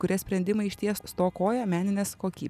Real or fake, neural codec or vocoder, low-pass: real; none; 14.4 kHz